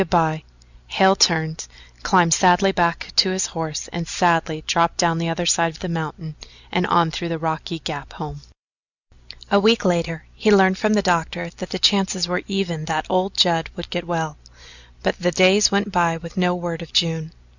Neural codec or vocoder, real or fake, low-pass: none; real; 7.2 kHz